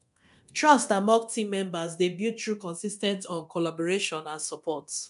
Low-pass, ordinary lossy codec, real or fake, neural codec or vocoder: 10.8 kHz; AAC, 96 kbps; fake; codec, 24 kHz, 0.9 kbps, DualCodec